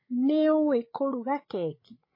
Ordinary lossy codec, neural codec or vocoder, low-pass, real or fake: MP3, 24 kbps; codec, 16 kHz, 8 kbps, FreqCodec, larger model; 5.4 kHz; fake